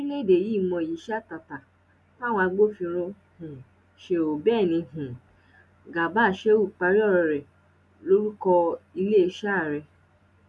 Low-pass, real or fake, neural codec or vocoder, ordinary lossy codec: none; real; none; none